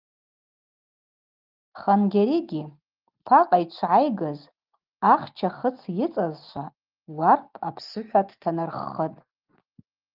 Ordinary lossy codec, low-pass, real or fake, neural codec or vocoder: Opus, 24 kbps; 5.4 kHz; real; none